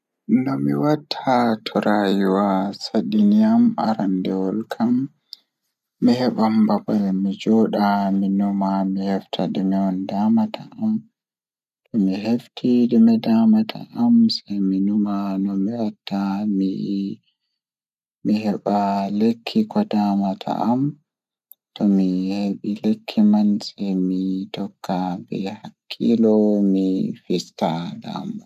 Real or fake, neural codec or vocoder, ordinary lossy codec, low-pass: real; none; none; 14.4 kHz